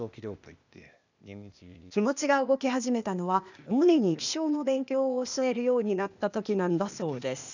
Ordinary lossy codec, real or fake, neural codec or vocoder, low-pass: none; fake; codec, 16 kHz, 0.8 kbps, ZipCodec; 7.2 kHz